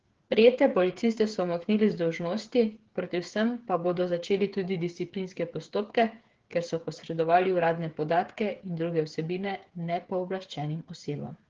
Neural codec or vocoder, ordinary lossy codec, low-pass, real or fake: codec, 16 kHz, 8 kbps, FreqCodec, smaller model; Opus, 16 kbps; 7.2 kHz; fake